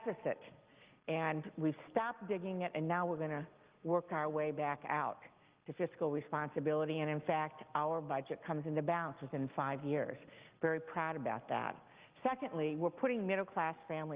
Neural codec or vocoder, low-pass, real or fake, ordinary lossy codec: none; 3.6 kHz; real; Opus, 32 kbps